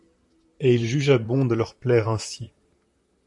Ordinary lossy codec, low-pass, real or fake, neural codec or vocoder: MP3, 64 kbps; 10.8 kHz; fake; vocoder, 44.1 kHz, 128 mel bands, Pupu-Vocoder